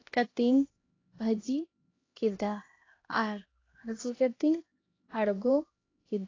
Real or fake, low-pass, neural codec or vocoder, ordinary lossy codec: fake; 7.2 kHz; codec, 16 kHz, 1 kbps, X-Codec, HuBERT features, trained on LibriSpeech; AAC, 32 kbps